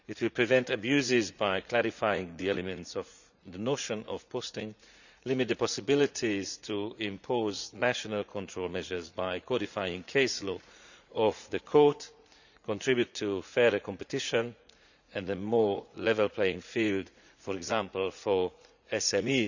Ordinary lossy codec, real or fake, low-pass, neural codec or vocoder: none; fake; 7.2 kHz; vocoder, 44.1 kHz, 80 mel bands, Vocos